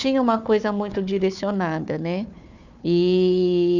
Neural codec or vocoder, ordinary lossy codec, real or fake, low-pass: codec, 16 kHz, 4 kbps, FunCodec, trained on Chinese and English, 50 frames a second; none; fake; 7.2 kHz